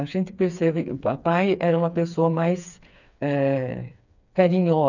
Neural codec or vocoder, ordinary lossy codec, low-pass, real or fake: codec, 16 kHz, 4 kbps, FreqCodec, smaller model; none; 7.2 kHz; fake